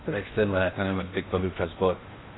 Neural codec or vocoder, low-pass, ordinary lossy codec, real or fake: codec, 16 kHz in and 24 kHz out, 0.8 kbps, FocalCodec, streaming, 65536 codes; 7.2 kHz; AAC, 16 kbps; fake